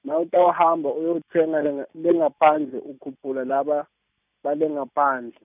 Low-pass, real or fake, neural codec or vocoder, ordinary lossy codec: 3.6 kHz; real; none; none